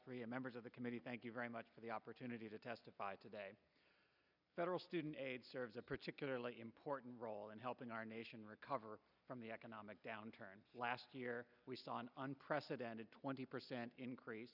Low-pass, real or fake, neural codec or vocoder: 5.4 kHz; real; none